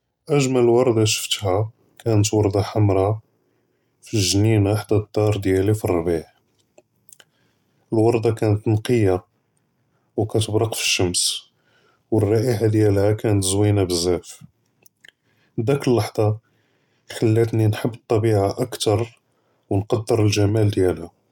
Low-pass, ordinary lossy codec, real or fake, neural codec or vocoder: 19.8 kHz; none; real; none